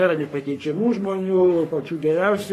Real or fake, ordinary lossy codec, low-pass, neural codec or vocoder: fake; AAC, 48 kbps; 14.4 kHz; codec, 44.1 kHz, 3.4 kbps, Pupu-Codec